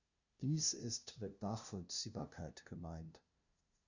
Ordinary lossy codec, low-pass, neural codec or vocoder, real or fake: Opus, 64 kbps; 7.2 kHz; codec, 16 kHz, 0.5 kbps, FunCodec, trained on LibriTTS, 25 frames a second; fake